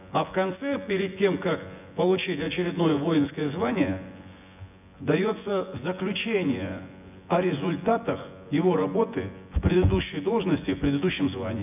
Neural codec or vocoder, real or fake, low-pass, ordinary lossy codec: vocoder, 24 kHz, 100 mel bands, Vocos; fake; 3.6 kHz; none